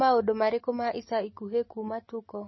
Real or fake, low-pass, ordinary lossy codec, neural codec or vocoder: real; 7.2 kHz; MP3, 24 kbps; none